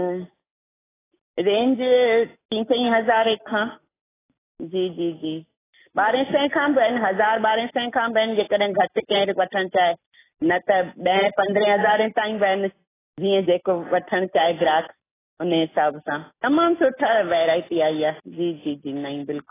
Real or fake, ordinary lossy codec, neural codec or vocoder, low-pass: real; AAC, 16 kbps; none; 3.6 kHz